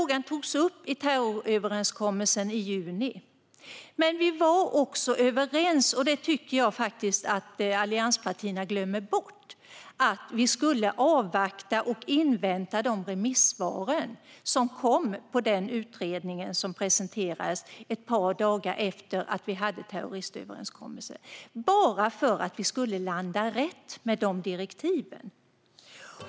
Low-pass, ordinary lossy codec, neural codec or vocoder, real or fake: none; none; none; real